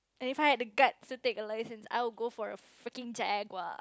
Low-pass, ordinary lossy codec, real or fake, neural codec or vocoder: none; none; real; none